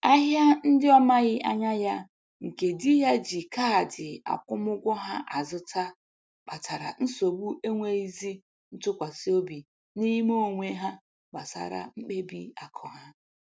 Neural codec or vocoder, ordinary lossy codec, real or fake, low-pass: none; none; real; none